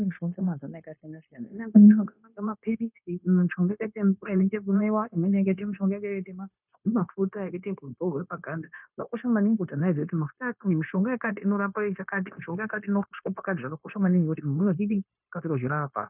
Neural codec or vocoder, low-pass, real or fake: codec, 16 kHz, 0.9 kbps, LongCat-Audio-Codec; 3.6 kHz; fake